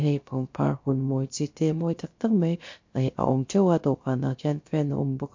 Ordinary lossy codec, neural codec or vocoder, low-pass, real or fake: MP3, 48 kbps; codec, 16 kHz, 0.7 kbps, FocalCodec; 7.2 kHz; fake